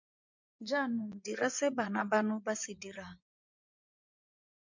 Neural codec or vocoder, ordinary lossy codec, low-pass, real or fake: vocoder, 24 kHz, 100 mel bands, Vocos; MP3, 64 kbps; 7.2 kHz; fake